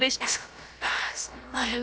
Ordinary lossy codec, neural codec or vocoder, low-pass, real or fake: none; codec, 16 kHz, about 1 kbps, DyCAST, with the encoder's durations; none; fake